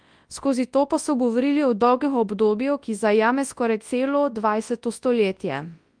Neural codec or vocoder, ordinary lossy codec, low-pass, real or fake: codec, 24 kHz, 0.9 kbps, WavTokenizer, large speech release; Opus, 32 kbps; 9.9 kHz; fake